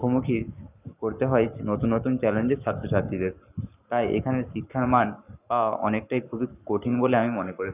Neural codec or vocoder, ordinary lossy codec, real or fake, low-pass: codec, 44.1 kHz, 7.8 kbps, Pupu-Codec; none; fake; 3.6 kHz